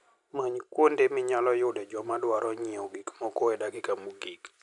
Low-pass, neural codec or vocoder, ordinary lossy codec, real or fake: 10.8 kHz; none; none; real